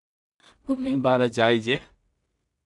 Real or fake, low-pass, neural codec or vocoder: fake; 10.8 kHz; codec, 16 kHz in and 24 kHz out, 0.4 kbps, LongCat-Audio-Codec, two codebook decoder